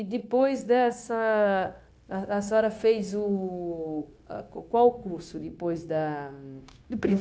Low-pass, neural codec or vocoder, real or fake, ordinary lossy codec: none; codec, 16 kHz, 0.9 kbps, LongCat-Audio-Codec; fake; none